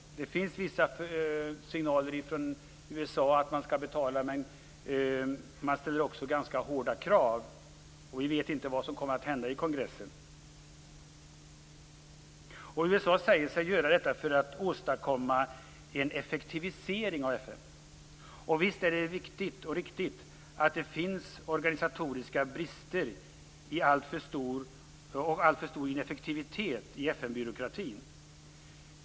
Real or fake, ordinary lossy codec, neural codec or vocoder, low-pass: real; none; none; none